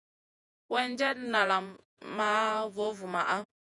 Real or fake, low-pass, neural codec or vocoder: fake; 10.8 kHz; vocoder, 48 kHz, 128 mel bands, Vocos